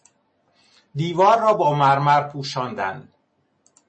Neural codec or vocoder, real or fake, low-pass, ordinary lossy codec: none; real; 9.9 kHz; MP3, 32 kbps